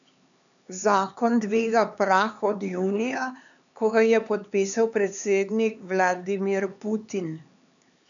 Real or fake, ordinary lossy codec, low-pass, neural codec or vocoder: fake; none; 7.2 kHz; codec, 16 kHz, 4 kbps, X-Codec, HuBERT features, trained on LibriSpeech